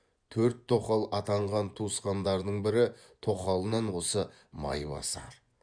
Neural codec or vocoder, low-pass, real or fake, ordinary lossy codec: vocoder, 24 kHz, 100 mel bands, Vocos; 9.9 kHz; fake; none